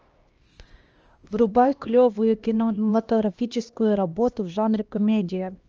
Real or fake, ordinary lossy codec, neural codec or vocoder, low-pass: fake; Opus, 24 kbps; codec, 16 kHz, 1 kbps, X-Codec, HuBERT features, trained on LibriSpeech; 7.2 kHz